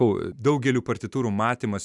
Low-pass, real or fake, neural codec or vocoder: 10.8 kHz; real; none